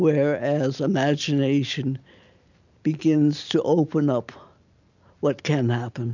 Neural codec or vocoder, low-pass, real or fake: none; 7.2 kHz; real